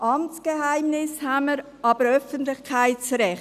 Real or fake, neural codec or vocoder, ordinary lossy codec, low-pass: real; none; none; 14.4 kHz